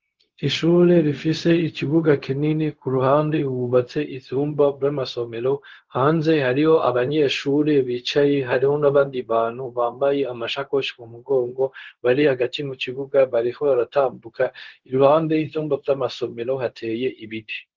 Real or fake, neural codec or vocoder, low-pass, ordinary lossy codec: fake; codec, 16 kHz, 0.4 kbps, LongCat-Audio-Codec; 7.2 kHz; Opus, 16 kbps